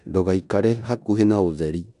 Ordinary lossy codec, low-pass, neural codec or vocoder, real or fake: none; 10.8 kHz; codec, 16 kHz in and 24 kHz out, 0.9 kbps, LongCat-Audio-Codec, four codebook decoder; fake